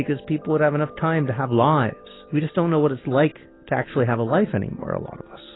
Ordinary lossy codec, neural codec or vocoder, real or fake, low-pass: AAC, 16 kbps; none; real; 7.2 kHz